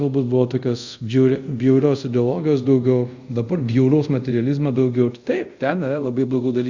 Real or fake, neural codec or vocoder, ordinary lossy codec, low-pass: fake; codec, 24 kHz, 0.5 kbps, DualCodec; Opus, 64 kbps; 7.2 kHz